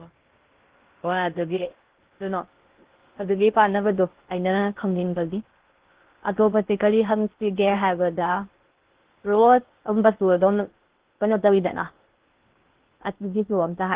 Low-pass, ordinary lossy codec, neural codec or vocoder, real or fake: 3.6 kHz; Opus, 16 kbps; codec, 16 kHz in and 24 kHz out, 0.6 kbps, FocalCodec, streaming, 2048 codes; fake